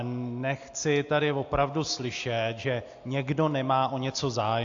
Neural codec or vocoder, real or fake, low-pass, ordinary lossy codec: none; real; 7.2 kHz; AAC, 48 kbps